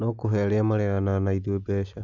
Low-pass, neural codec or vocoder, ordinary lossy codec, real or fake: 7.2 kHz; none; none; real